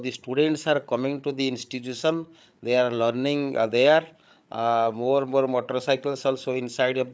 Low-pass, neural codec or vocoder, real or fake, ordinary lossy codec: none; codec, 16 kHz, 8 kbps, FreqCodec, larger model; fake; none